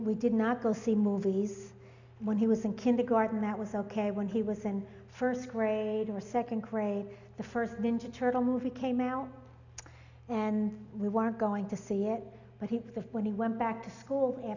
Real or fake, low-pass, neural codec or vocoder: real; 7.2 kHz; none